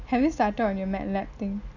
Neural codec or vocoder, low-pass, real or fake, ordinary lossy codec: none; 7.2 kHz; real; none